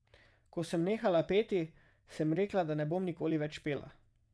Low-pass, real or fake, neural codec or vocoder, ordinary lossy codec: none; fake; vocoder, 22.05 kHz, 80 mel bands, WaveNeXt; none